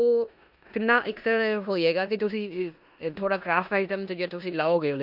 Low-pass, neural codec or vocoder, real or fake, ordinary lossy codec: 5.4 kHz; codec, 16 kHz in and 24 kHz out, 0.9 kbps, LongCat-Audio-Codec, four codebook decoder; fake; none